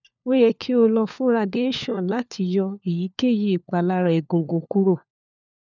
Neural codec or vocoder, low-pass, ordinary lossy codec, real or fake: codec, 16 kHz, 4 kbps, FunCodec, trained on LibriTTS, 50 frames a second; 7.2 kHz; none; fake